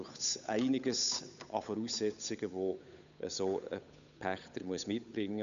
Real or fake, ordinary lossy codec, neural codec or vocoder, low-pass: fake; none; codec, 16 kHz, 8 kbps, FunCodec, trained on Chinese and English, 25 frames a second; 7.2 kHz